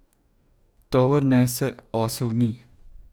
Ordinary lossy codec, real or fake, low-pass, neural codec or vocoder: none; fake; none; codec, 44.1 kHz, 2.6 kbps, DAC